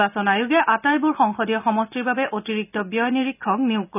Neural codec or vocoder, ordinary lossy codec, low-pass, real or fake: none; none; 3.6 kHz; real